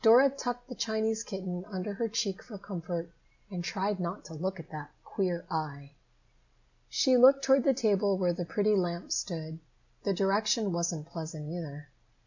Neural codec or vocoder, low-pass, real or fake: none; 7.2 kHz; real